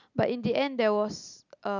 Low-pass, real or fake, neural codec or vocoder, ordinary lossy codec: 7.2 kHz; real; none; none